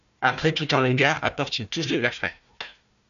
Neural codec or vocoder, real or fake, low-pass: codec, 16 kHz, 1 kbps, FunCodec, trained on Chinese and English, 50 frames a second; fake; 7.2 kHz